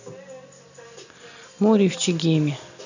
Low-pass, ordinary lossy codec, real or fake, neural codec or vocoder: 7.2 kHz; none; real; none